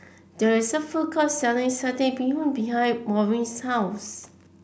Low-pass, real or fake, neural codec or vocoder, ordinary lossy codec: none; real; none; none